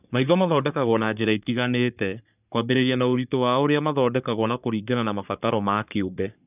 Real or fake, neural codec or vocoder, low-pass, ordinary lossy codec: fake; codec, 44.1 kHz, 3.4 kbps, Pupu-Codec; 3.6 kHz; none